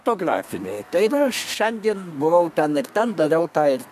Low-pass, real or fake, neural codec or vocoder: 14.4 kHz; fake; codec, 32 kHz, 1.9 kbps, SNAC